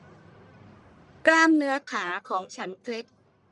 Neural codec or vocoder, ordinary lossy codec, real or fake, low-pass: codec, 44.1 kHz, 1.7 kbps, Pupu-Codec; none; fake; 10.8 kHz